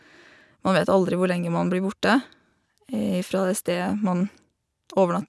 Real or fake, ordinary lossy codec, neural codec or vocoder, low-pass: real; none; none; none